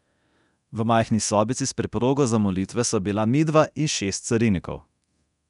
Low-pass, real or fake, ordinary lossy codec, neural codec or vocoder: 10.8 kHz; fake; none; codec, 24 kHz, 0.9 kbps, DualCodec